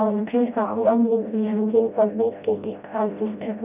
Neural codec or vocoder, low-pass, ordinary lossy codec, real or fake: codec, 16 kHz, 0.5 kbps, FreqCodec, smaller model; 3.6 kHz; none; fake